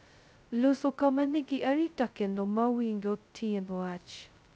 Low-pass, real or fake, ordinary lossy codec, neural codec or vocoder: none; fake; none; codec, 16 kHz, 0.2 kbps, FocalCodec